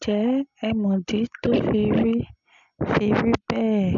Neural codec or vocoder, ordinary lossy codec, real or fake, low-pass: none; MP3, 96 kbps; real; 7.2 kHz